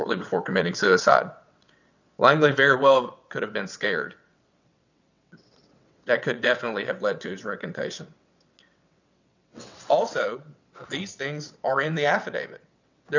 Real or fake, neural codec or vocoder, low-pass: fake; vocoder, 22.05 kHz, 80 mel bands, WaveNeXt; 7.2 kHz